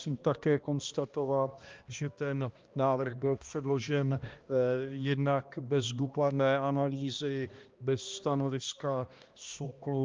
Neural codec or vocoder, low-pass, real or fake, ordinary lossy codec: codec, 16 kHz, 1 kbps, X-Codec, HuBERT features, trained on balanced general audio; 7.2 kHz; fake; Opus, 24 kbps